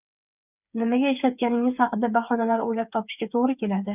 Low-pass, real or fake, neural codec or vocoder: 3.6 kHz; fake; codec, 16 kHz, 8 kbps, FreqCodec, smaller model